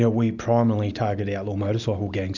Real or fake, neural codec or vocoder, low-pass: real; none; 7.2 kHz